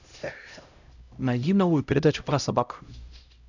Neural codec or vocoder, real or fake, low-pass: codec, 16 kHz, 0.5 kbps, X-Codec, HuBERT features, trained on LibriSpeech; fake; 7.2 kHz